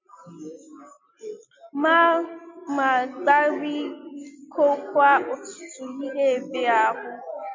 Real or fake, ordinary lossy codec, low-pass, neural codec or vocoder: real; MP3, 64 kbps; 7.2 kHz; none